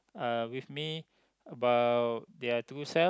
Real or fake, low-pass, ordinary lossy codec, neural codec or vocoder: real; none; none; none